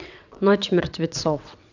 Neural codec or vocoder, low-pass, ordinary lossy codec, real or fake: vocoder, 22.05 kHz, 80 mel bands, WaveNeXt; 7.2 kHz; none; fake